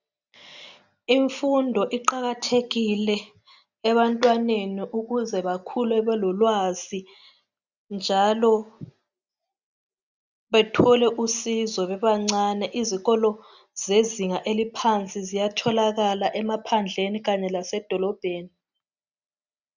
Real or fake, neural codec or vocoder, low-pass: real; none; 7.2 kHz